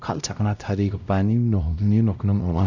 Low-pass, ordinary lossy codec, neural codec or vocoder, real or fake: 7.2 kHz; none; codec, 16 kHz, 0.5 kbps, X-Codec, WavLM features, trained on Multilingual LibriSpeech; fake